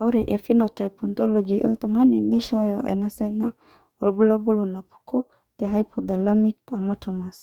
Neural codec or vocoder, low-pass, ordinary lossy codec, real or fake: codec, 44.1 kHz, 2.6 kbps, DAC; 19.8 kHz; Opus, 64 kbps; fake